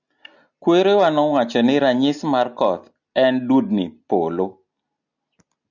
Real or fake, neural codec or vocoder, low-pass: real; none; 7.2 kHz